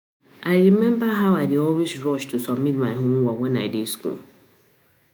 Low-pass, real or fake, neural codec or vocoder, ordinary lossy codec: none; fake; autoencoder, 48 kHz, 128 numbers a frame, DAC-VAE, trained on Japanese speech; none